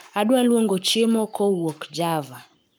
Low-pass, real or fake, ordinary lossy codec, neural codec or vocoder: none; fake; none; codec, 44.1 kHz, 7.8 kbps, Pupu-Codec